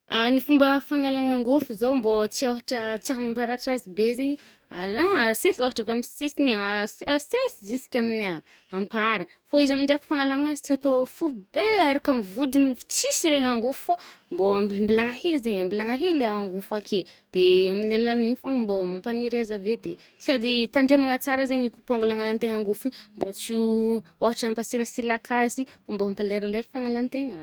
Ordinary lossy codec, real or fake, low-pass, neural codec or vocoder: none; fake; none; codec, 44.1 kHz, 2.6 kbps, DAC